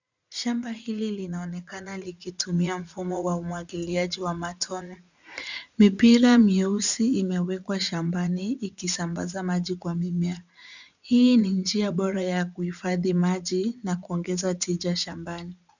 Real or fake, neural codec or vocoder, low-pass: fake; vocoder, 44.1 kHz, 80 mel bands, Vocos; 7.2 kHz